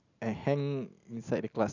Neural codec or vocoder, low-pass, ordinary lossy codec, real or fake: none; 7.2 kHz; none; real